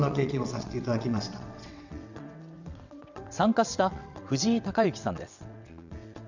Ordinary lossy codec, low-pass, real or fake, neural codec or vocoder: none; 7.2 kHz; fake; vocoder, 22.05 kHz, 80 mel bands, WaveNeXt